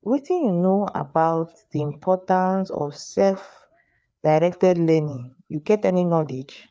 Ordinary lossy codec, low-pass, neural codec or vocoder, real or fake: none; none; codec, 16 kHz, 4 kbps, FreqCodec, larger model; fake